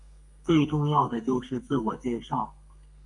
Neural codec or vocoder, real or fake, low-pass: codec, 32 kHz, 1.9 kbps, SNAC; fake; 10.8 kHz